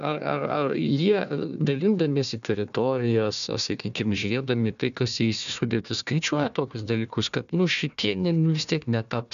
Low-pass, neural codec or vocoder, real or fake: 7.2 kHz; codec, 16 kHz, 1 kbps, FunCodec, trained on Chinese and English, 50 frames a second; fake